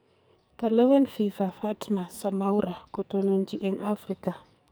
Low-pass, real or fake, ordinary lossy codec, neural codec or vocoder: none; fake; none; codec, 44.1 kHz, 2.6 kbps, SNAC